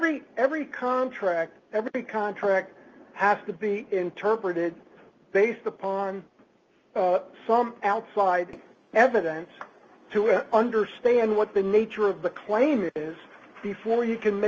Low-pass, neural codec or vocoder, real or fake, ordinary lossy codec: 7.2 kHz; none; real; Opus, 24 kbps